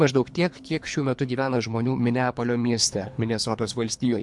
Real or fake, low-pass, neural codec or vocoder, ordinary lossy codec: fake; 10.8 kHz; codec, 24 kHz, 3 kbps, HILCodec; MP3, 64 kbps